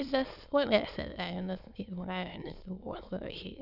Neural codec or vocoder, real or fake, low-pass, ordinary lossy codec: autoencoder, 22.05 kHz, a latent of 192 numbers a frame, VITS, trained on many speakers; fake; 5.4 kHz; Opus, 64 kbps